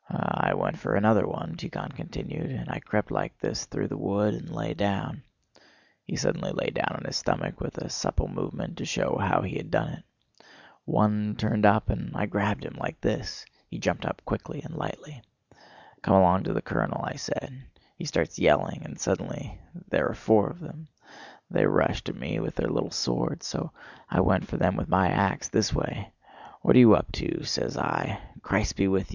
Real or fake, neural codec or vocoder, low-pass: real; none; 7.2 kHz